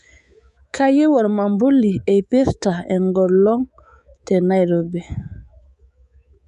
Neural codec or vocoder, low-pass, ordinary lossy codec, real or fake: codec, 24 kHz, 3.1 kbps, DualCodec; 10.8 kHz; none; fake